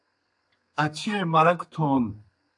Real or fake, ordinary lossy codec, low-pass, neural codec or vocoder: fake; AAC, 64 kbps; 10.8 kHz; codec, 32 kHz, 1.9 kbps, SNAC